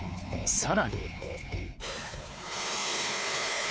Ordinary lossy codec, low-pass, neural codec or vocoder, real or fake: none; none; codec, 16 kHz, 4 kbps, X-Codec, WavLM features, trained on Multilingual LibriSpeech; fake